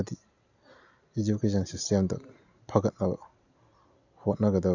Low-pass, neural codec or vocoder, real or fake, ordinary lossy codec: 7.2 kHz; none; real; none